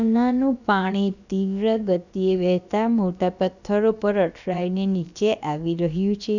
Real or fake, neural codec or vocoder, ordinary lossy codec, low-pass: fake; codec, 16 kHz, about 1 kbps, DyCAST, with the encoder's durations; none; 7.2 kHz